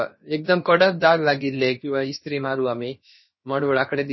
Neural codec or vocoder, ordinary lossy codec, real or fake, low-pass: codec, 16 kHz, about 1 kbps, DyCAST, with the encoder's durations; MP3, 24 kbps; fake; 7.2 kHz